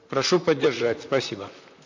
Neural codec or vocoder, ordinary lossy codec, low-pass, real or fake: vocoder, 44.1 kHz, 128 mel bands, Pupu-Vocoder; MP3, 48 kbps; 7.2 kHz; fake